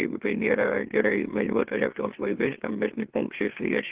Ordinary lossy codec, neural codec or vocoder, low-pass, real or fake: Opus, 16 kbps; autoencoder, 44.1 kHz, a latent of 192 numbers a frame, MeloTTS; 3.6 kHz; fake